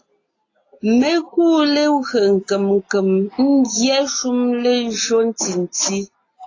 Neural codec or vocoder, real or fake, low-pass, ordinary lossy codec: none; real; 7.2 kHz; AAC, 32 kbps